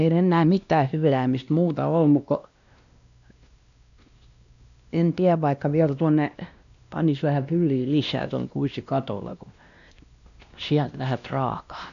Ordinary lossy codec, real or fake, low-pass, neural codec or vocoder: none; fake; 7.2 kHz; codec, 16 kHz, 1 kbps, X-Codec, WavLM features, trained on Multilingual LibriSpeech